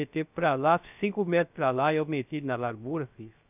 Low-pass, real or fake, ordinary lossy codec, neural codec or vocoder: 3.6 kHz; fake; none; codec, 16 kHz, 0.3 kbps, FocalCodec